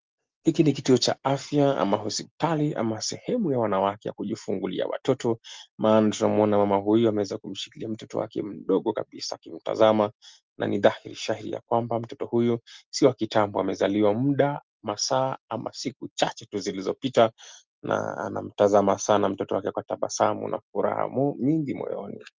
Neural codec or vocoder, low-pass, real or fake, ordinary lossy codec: none; 7.2 kHz; real; Opus, 32 kbps